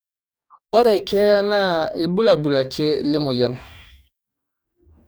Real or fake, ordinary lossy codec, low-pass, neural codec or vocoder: fake; none; none; codec, 44.1 kHz, 2.6 kbps, DAC